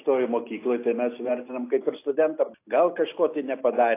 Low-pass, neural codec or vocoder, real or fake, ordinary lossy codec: 3.6 kHz; none; real; AAC, 24 kbps